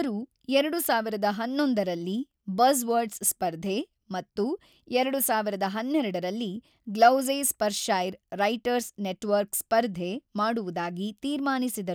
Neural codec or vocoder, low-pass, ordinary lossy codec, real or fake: none; none; none; real